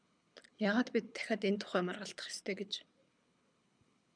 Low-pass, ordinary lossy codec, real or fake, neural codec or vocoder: 9.9 kHz; MP3, 96 kbps; fake; codec, 24 kHz, 6 kbps, HILCodec